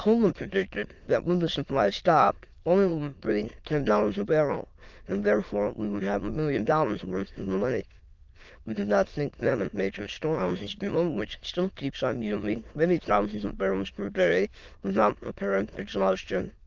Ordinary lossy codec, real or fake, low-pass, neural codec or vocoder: Opus, 24 kbps; fake; 7.2 kHz; autoencoder, 22.05 kHz, a latent of 192 numbers a frame, VITS, trained on many speakers